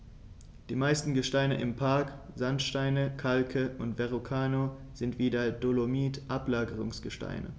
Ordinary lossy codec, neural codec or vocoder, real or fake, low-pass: none; none; real; none